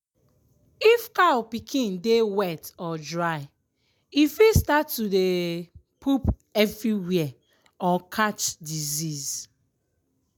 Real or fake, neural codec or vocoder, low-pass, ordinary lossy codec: real; none; none; none